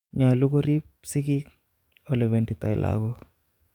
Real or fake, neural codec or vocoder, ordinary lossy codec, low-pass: fake; codec, 44.1 kHz, 7.8 kbps, DAC; none; 19.8 kHz